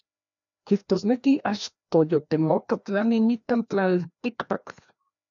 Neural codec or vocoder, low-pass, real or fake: codec, 16 kHz, 1 kbps, FreqCodec, larger model; 7.2 kHz; fake